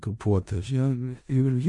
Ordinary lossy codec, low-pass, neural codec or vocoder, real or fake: MP3, 64 kbps; 10.8 kHz; codec, 16 kHz in and 24 kHz out, 0.4 kbps, LongCat-Audio-Codec, four codebook decoder; fake